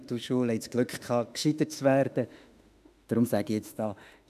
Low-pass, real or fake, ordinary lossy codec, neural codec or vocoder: 14.4 kHz; fake; none; autoencoder, 48 kHz, 32 numbers a frame, DAC-VAE, trained on Japanese speech